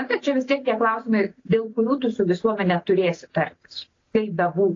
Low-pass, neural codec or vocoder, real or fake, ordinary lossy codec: 7.2 kHz; none; real; AAC, 32 kbps